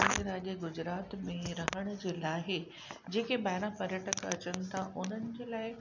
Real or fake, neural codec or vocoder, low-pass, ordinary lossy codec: real; none; 7.2 kHz; none